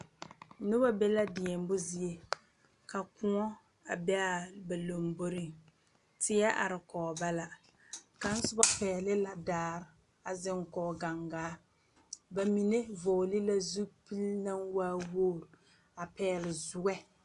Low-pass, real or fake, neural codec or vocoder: 10.8 kHz; real; none